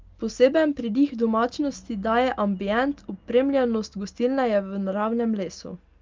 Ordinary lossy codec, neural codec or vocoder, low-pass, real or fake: Opus, 24 kbps; none; 7.2 kHz; real